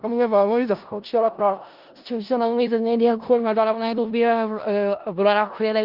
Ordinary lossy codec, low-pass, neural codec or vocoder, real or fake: Opus, 32 kbps; 5.4 kHz; codec, 16 kHz in and 24 kHz out, 0.4 kbps, LongCat-Audio-Codec, four codebook decoder; fake